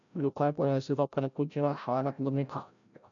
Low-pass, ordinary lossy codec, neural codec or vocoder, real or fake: 7.2 kHz; none; codec, 16 kHz, 0.5 kbps, FreqCodec, larger model; fake